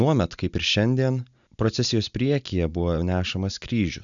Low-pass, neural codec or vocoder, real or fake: 7.2 kHz; none; real